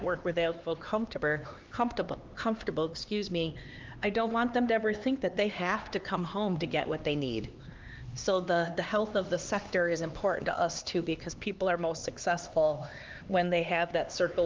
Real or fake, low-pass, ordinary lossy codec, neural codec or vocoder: fake; 7.2 kHz; Opus, 32 kbps; codec, 16 kHz, 2 kbps, X-Codec, HuBERT features, trained on LibriSpeech